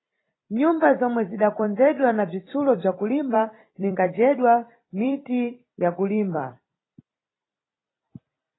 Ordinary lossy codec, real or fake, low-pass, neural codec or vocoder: AAC, 16 kbps; fake; 7.2 kHz; vocoder, 44.1 kHz, 128 mel bands every 256 samples, BigVGAN v2